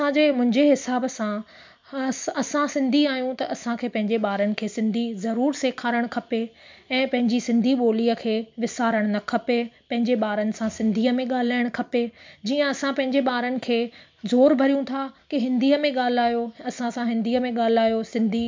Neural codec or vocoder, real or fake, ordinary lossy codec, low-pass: none; real; MP3, 64 kbps; 7.2 kHz